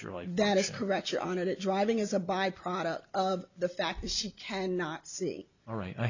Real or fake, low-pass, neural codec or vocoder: real; 7.2 kHz; none